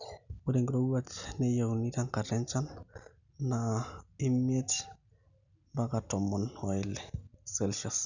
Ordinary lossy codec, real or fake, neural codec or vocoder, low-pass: none; real; none; 7.2 kHz